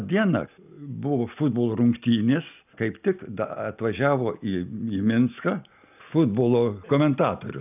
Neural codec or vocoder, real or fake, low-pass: none; real; 3.6 kHz